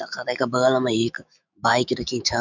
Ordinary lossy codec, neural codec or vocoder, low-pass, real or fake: none; autoencoder, 48 kHz, 128 numbers a frame, DAC-VAE, trained on Japanese speech; 7.2 kHz; fake